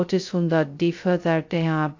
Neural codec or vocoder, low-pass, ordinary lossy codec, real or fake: codec, 16 kHz, 0.2 kbps, FocalCodec; 7.2 kHz; AAC, 48 kbps; fake